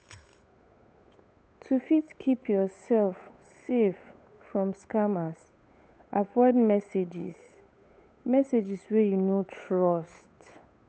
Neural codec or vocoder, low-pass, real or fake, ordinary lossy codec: codec, 16 kHz, 8 kbps, FunCodec, trained on Chinese and English, 25 frames a second; none; fake; none